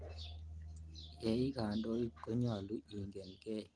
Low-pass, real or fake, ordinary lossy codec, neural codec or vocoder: 9.9 kHz; real; Opus, 24 kbps; none